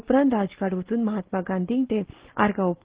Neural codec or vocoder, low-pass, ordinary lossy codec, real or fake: none; 3.6 kHz; Opus, 16 kbps; real